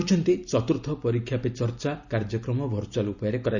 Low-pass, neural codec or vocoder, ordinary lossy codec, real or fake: 7.2 kHz; none; none; real